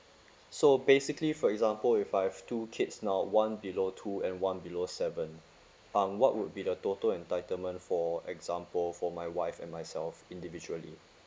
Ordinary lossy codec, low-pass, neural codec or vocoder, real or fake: none; none; none; real